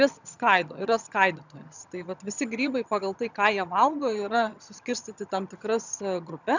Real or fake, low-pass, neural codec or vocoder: fake; 7.2 kHz; vocoder, 22.05 kHz, 80 mel bands, HiFi-GAN